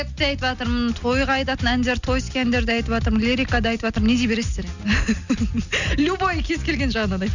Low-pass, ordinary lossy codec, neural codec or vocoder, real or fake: 7.2 kHz; none; none; real